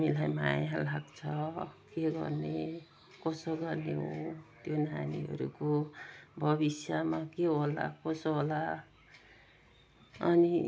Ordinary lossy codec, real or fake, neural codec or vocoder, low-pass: none; real; none; none